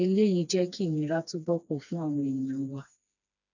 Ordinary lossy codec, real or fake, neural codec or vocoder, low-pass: none; fake; codec, 16 kHz, 2 kbps, FreqCodec, smaller model; 7.2 kHz